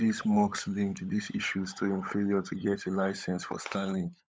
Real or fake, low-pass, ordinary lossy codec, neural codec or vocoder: fake; none; none; codec, 16 kHz, 16 kbps, FunCodec, trained on LibriTTS, 50 frames a second